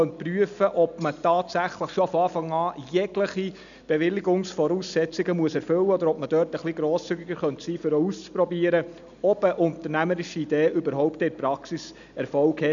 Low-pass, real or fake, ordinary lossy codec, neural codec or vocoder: 7.2 kHz; real; none; none